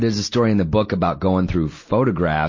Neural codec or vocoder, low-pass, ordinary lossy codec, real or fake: none; 7.2 kHz; MP3, 32 kbps; real